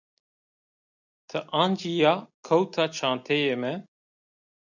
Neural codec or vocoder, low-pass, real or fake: none; 7.2 kHz; real